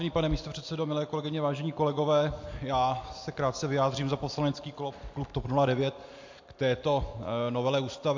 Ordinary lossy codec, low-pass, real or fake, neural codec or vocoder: MP3, 48 kbps; 7.2 kHz; real; none